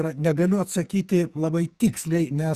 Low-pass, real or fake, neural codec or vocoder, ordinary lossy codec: 14.4 kHz; fake; codec, 44.1 kHz, 2.6 kbps, SNAC; Opus, 64 kbps